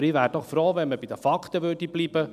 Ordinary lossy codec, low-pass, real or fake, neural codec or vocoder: none; 14.4 kHz; real; none